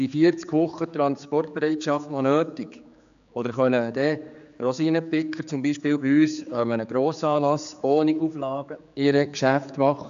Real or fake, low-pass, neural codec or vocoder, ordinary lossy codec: fake; 7.2 kHz; codec, 16 kHz, 4 kbps, X-Codec, HuBERT features, trained on general audio; AAC, 96 kbps